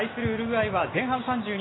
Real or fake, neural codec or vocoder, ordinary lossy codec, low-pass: real; none; AAC, 16 kbps; 7.2 kHz